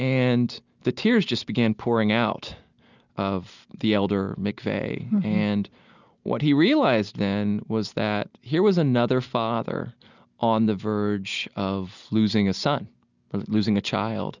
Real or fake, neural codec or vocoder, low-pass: real; none; 7.2 kHz